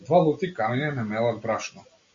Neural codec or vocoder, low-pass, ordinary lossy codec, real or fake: none; 7.2 kHz; AAC, 48 kbps; real